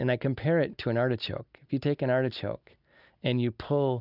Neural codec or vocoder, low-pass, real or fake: none; 5.4 kHz; real